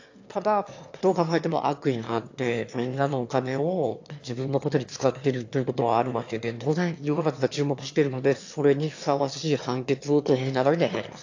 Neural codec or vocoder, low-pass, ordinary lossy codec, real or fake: autoencoder, 22.05 kHz, a latent of 192 numbers a frame, VITS, trained on one speaker; 7.2 kHz; AAC, 48 kbps; fake